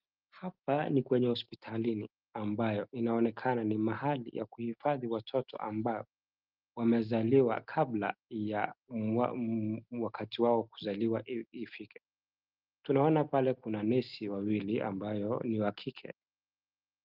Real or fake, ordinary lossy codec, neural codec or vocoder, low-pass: real; Opus, 24 kbps; none; 5.4 kHz